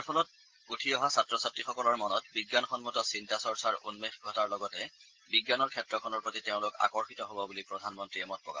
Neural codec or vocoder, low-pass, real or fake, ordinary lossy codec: none; 7.2 kHz; real; Opus, 16 kbps